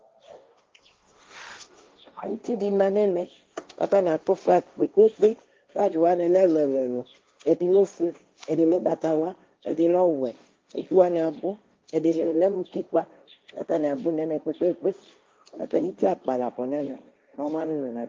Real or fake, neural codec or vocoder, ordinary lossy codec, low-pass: fake; codec, 16 kHz, 1.1 kbps, Voila-Tokenizer; Opus, 24 kbps; 7.2 kHz